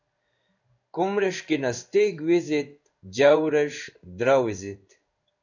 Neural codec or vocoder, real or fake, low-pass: codec, 16 kHz in and 24 kHz out, 1 kbps, XY-Tokenizer; fake; 7.2 kHz